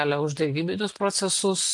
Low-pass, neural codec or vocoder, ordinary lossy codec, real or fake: 10.8 kHz; none; AAC, 64 kbps; real